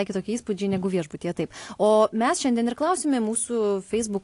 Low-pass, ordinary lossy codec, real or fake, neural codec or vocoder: 10.8 kHz; AAC, 48 kbps; real; none